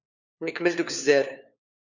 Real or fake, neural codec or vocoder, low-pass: fake; codec, 16 kHz, 4 kbps, FunCodec, trained on LibriTTS, 50 frames a second; 7.2 kHz